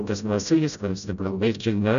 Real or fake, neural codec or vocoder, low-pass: fake; codec, 16 kHz, 0.5 kbps, FreqCodec, smaller model; 7.2 kHz